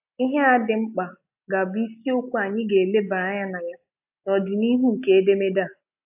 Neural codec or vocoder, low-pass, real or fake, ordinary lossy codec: none; 3.6 kHz; real; AAC, 32 kbps